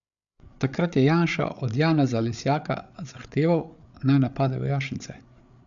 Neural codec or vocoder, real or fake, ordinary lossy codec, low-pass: codec, 16 kHz, 8 kbps, FreqCodec, larger model; fake; none; 7.2 kHz